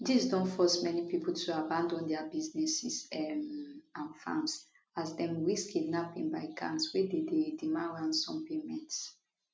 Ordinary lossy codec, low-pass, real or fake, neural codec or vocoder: none; none; real; none